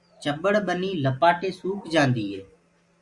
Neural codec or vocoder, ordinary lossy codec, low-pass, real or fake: none; Opus, 64 kbps; 10.8 kHz; real